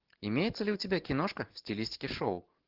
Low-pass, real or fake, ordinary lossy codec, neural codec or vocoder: 5.4 kHz; real; Opus, 16 kbps; none